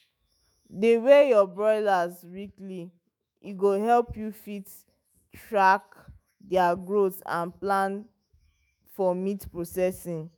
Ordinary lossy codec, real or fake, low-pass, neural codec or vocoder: none; fake; none; autoencoder, 48 kHz, 128 numbers a frame, DAC-VAE, trained on Japanese speech